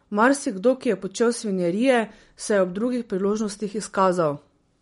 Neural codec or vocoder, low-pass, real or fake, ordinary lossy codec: none; 10.8 kHz; real; MP3, 48 kbps